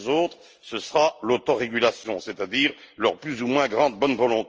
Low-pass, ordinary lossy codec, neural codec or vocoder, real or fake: 7.2 kHz; Opus, 24 kbps; none; real